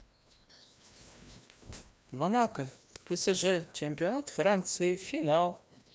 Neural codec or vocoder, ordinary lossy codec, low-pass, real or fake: codec, 16 kHz, 1 kbps, FreqCodec, larger model; none; none; fake